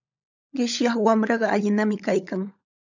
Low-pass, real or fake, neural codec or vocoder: 7.2 kHz; fake; codec, 16 kHz, 16 kbps, FunCodec, trained on LibriTTS, 50 frames a second